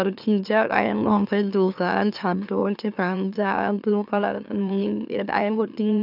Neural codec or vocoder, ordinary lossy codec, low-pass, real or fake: autoencoder, 44.1 kHz, a latent of 192 numbers a frame, MeloTTS; none; 5.4 kHz; fake